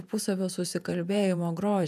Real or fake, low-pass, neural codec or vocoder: fake; 14.4 kHz; vocoder, 48 kHz, 128 mel bands, Vocos